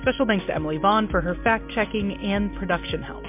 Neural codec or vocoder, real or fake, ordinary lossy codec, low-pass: none; real; MP3, 32 kbps; 3.6 kHz